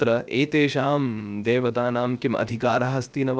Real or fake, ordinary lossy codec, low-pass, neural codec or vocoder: fake; none; none; codec, 16 kHz, 0.7 kbps, FocalCodec